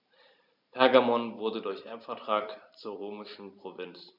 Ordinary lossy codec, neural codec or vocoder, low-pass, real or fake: none; vocoder, 44.1 kHz, 128 mel bands every 512 samples, BigVGAN v2; 5.4 kHz; fake